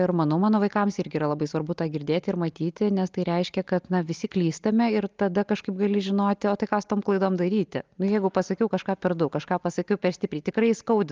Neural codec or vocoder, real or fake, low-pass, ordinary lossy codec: none; real; 7.2 kHz; Opus, 24 kbps